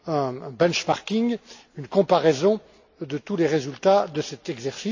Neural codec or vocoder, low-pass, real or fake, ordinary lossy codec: none; 7.2 kHz; real; AAC, 48 kbps